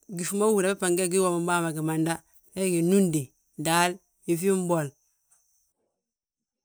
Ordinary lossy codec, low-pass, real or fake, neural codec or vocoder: none; none; real; none